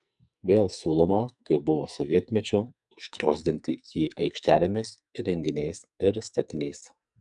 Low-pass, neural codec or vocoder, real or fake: 10.8 kHz; codec, 44.1 kHz, 2.6 kbps, SNAC; fake